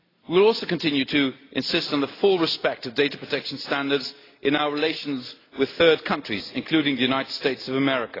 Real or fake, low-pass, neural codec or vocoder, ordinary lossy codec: real; 5.4 kHz; none; AAC, 24 kbps